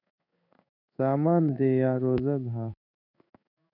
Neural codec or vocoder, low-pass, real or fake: codec, 16 kHz in and 24 kHz out, 1 kbps, XY-Tokenizer; 5.4 kHz; fake